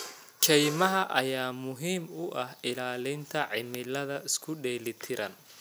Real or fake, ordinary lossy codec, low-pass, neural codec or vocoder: real; none; none; none